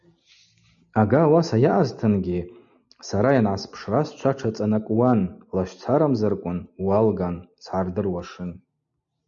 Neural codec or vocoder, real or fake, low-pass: none; real; 7.2 kHz